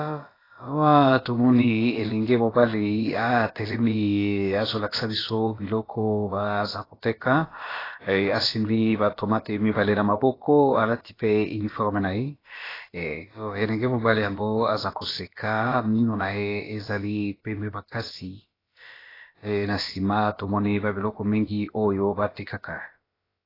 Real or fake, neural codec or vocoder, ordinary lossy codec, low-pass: fake; codec, 16 kHz, about 1 kbps, DyCAST, with the encoder's durations; AAC, 24 kbps; 5.4 kHz